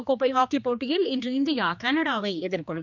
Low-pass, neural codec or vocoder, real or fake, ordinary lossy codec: 7.2 kHz; codec, 16 kHz, 2 kbps, X-Codec, HuBERT features, trained on general audio; fake; none